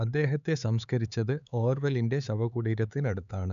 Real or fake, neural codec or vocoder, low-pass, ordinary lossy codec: fake; codec, 16 kHz, 4 kbps, X-Codec, HuBERT features, trained on LibriSpeech; 7.2 kHz; none